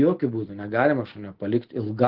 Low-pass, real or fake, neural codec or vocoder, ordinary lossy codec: 5.4 kHz; real; none; Opus, 16 kbps